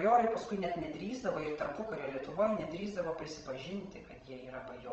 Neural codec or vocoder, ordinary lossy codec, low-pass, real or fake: codec, 16 kHz, 16 kbps, FreqCodec, larger model; Opus, 24 kbps; 7.2 kHz; fake